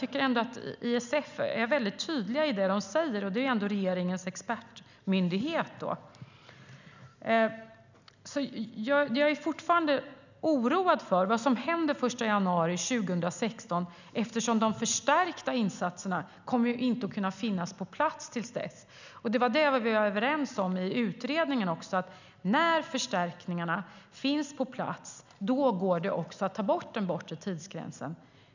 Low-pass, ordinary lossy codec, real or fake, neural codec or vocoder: 7.2 kHz; none; real; none